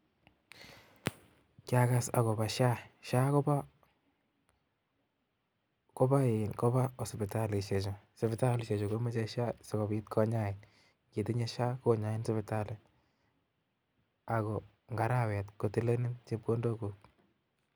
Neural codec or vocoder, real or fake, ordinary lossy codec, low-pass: none; real; none; none